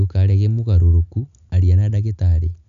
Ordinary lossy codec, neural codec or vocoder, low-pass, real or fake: none; none; 7.2 kHz; real